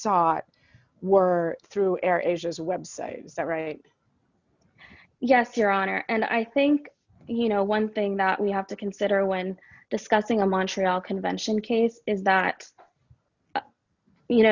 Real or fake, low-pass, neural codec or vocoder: real; 7.2 kHz; none